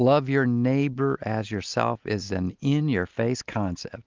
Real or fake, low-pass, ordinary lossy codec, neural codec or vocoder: real; 7.2 kHz; Opus, 32 kbps; none